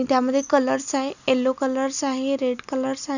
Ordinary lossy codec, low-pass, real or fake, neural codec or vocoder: none; 7.2 kHz; real; none